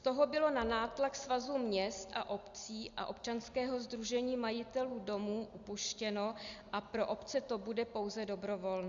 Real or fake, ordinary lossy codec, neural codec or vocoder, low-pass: real; Opus, 64 kbps; none; 7.2 kHz